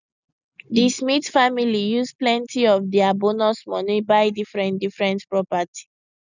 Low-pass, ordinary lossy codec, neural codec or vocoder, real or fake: 7.2 kHz; none; none; real